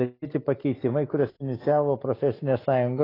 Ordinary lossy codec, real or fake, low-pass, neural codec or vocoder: AAC, 24 kbps; real; 5.4 kHz; none